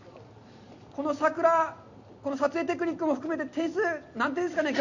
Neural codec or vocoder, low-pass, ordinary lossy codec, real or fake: none; 7.2 kHz; none; real